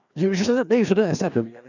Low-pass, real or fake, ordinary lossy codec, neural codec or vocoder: 7.2 kHz; fake; none; codec, 16 kHz, 2 kbps, FreqCodec, larger model